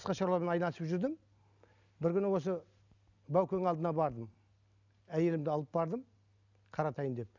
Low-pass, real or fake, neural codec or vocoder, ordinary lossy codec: 7.2 kHz; real; none; none